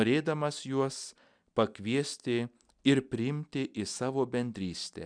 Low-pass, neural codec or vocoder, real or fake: 9.9 kHz; none; real